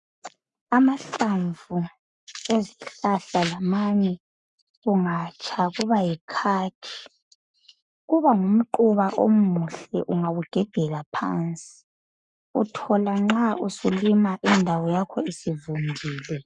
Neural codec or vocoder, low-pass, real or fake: codec, 44.1 kHz, 7.8 kbps, Pupu-Codec; 10.8 kHz; fake